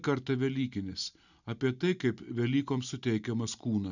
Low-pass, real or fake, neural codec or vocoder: 7.2 kHz; real; none